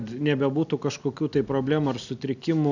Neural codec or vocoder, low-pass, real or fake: none; 7.2 kHz; real